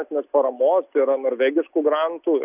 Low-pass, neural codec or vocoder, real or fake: 3.6 kHz; none; real